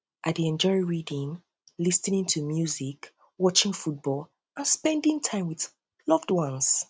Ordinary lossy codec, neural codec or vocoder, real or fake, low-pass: none; none; real; none